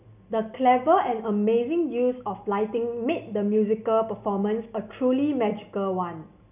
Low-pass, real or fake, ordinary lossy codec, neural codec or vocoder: 3.6 kHz; real; none; none